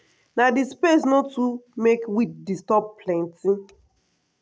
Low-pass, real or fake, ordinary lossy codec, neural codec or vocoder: none; real; none; none